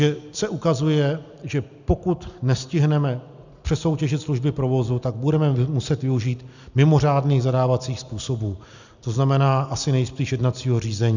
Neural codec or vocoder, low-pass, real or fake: none; 7.2 kHz; real